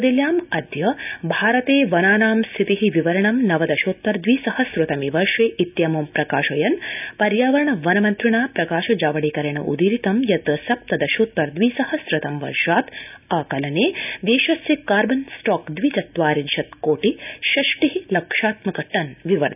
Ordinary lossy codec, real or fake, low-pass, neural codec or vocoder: none; real; 3.6 kHz; none